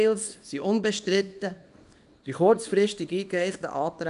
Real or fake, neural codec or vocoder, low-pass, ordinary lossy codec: fake; codec, 24 kHz, 0.9 kbps, WavTokenizer, small release; 10.8 kHz; none